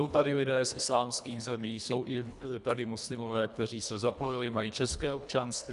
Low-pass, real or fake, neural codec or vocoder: 10.8 kHz; fake; codec, 24 kHz, 1.5 kbps, HILCodec